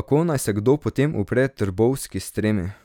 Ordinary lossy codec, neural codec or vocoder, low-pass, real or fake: none; none; 19.8 kHz; real